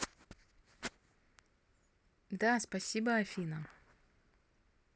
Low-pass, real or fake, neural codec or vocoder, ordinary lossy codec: none; real; none; none